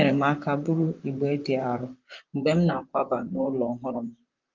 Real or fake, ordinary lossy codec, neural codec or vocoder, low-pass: fake; Opus, 24 kbps; vocoder, 44.1 kHz, 128 mel bands, Pupu-Vocoder; 7.2 kHz